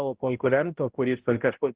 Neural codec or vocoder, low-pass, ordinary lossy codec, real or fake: codec, 16 kHz, 0.5 kbps, X-Codec, HuBERT features, trained on balanced general audio; 3.6 kHz; Opus, 16 kbps; fake